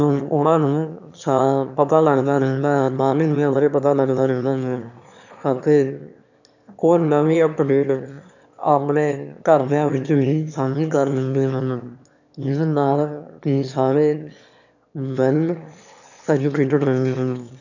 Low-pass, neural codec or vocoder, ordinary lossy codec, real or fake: 7.2 kHz; autoencoder, 22.05 kHz, a latent of 192 numbers a frame, VITS, trained on one speaker; none; fake